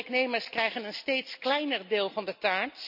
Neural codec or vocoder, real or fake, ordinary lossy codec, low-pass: none; real; none; 5.4 kHz